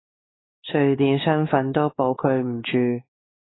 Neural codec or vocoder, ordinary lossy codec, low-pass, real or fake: codec, 16 kHz, 4 kbps, X-Codec, WavLM features, trained on Multilingual LibriSpeech; AAC, 16 kbps; 7.2 kHz; fake